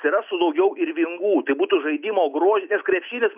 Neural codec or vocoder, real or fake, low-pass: none; real; 3.6 kHz